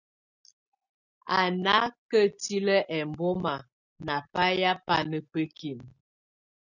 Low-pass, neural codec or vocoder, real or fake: 7.2 kHz; none; real